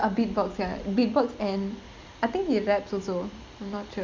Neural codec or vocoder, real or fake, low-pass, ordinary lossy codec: none; real; 7.2 kHz; MP3, 64 kbps